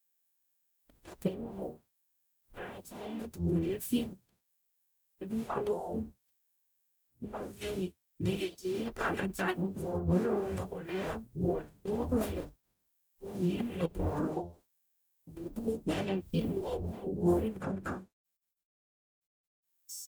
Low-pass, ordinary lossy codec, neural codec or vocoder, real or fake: none; none; codec, 44.1 kHz, 0.9 kbps, DAC; fake